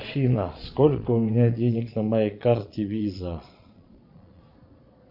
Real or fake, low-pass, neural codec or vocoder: fake; 5.4 kHz; vocoder, 22.05 kHz, 80 mel bands, Vocos